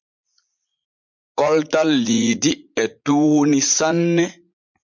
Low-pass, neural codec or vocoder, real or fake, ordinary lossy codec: 7.2 kHz; vocoder, 44.1 kHz, 80 mel bands, Vocos; fake; MP3, 64 kbps